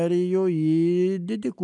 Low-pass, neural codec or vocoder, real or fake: 10.8 kHz; none; real